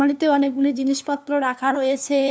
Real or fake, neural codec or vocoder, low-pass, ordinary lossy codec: fake; codec, 16 kHz, 2 kbps, FunCodec, trained on LibriTTS, 25 frames a second; none; none